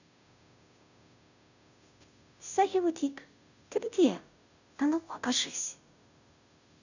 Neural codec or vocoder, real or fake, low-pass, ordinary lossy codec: codec, 16 kHz, 0.5 kbps, FunCodec, trained on Chinese and English, 25 frames a second; fake; 7.2 kHz; none